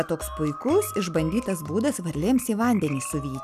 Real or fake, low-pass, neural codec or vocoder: real; 14.4 kHz; none